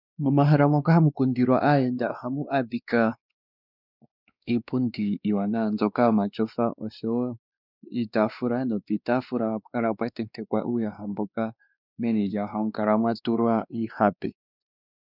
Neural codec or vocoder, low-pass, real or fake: codec, 16 kHz, 2 kbps, X-Codec, WavLM features, trained on Multilingual LibriSpeech; 5.4 kHz; fake